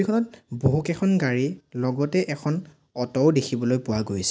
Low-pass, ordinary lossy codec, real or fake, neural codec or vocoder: none; none; real; none